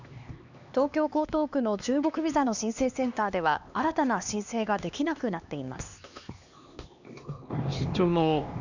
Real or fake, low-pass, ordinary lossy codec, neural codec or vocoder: fake; 7.2 kHz; none; codec, 16 kHz, 2 kbps, X-Codec, HuBERT features, trained on LibriSpeech